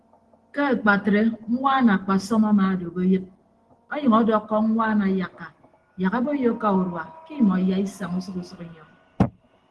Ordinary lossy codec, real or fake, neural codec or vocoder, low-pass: Opus, 16 kbps; real; none; 10.8 kHz